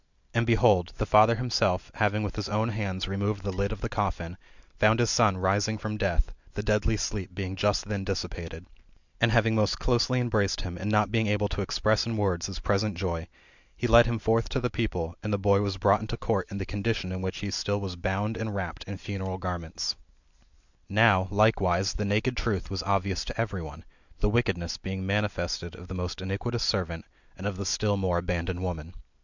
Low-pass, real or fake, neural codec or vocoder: 7.2 kHz; real; none